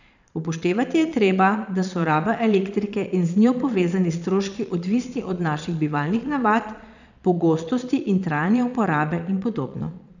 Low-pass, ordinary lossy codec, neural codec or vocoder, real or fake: 7.2 kHz; none; vocoder, 22.05 kHz, 80 mel bands, Vocos; fake